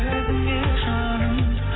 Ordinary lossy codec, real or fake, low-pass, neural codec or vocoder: AAC, 16 kbps; real; 7.2 kHz; none